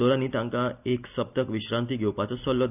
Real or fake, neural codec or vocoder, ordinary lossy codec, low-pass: real; none; none; 3.6 kHz